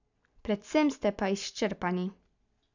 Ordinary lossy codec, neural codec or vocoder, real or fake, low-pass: none; none; real; 7.2 kHz